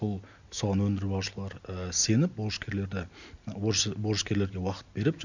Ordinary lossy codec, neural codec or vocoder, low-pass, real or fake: none; none; 7.2 kHz; real